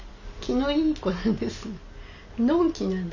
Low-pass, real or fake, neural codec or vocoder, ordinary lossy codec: 7.2 kHz; real; none; none